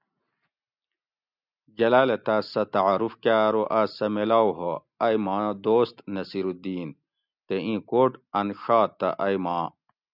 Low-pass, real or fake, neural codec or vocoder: 5.4 kHz; real; none